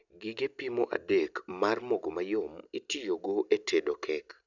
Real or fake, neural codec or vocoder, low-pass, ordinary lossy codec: real; none; 7.2 kHz; none